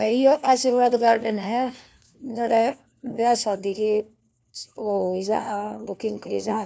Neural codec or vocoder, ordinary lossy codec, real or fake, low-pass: codec, 16 kHz, 1 kbps, FunCodec, trained on LibriTTS, 50 frames a second; none; fake; none